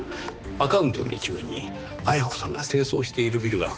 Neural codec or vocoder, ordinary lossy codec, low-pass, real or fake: codec, 16 kHz, 2 kbps, X-Codec, HuBERT features, trained on balanced general audio; none; none; fake